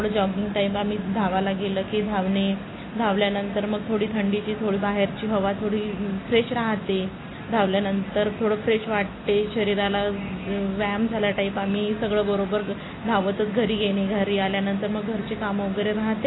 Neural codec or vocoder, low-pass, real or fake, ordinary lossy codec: none; 7.2 kHz; real; AAC, 16 kbps